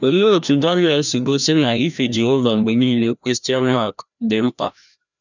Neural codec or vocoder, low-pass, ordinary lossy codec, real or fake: codec, 16 kHz, 1 kbps, FreqCodec, larger model; 7.2 kHz; none; fake